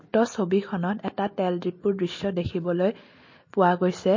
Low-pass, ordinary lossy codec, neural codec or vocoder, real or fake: 7.2 kHz; MP3, 32 kbps; none; real